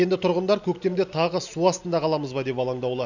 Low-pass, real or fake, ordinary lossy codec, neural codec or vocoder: 7.2 kHz; real; none; none